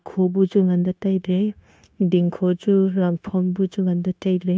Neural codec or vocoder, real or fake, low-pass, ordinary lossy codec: codec, 16 kHz, 0.9 kbps, LongCat-Audio-Codec; fake; none; none